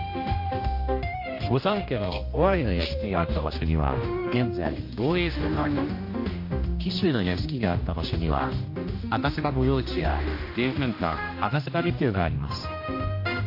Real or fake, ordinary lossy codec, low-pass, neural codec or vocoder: fake; MP3, 32 kbps; 5.4 kHz; codec, 16 kHz, 1 kbps, X-Codec, HuBERT features, trained on general audio